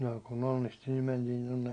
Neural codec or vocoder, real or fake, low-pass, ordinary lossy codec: none; real; 9.9 kHz; none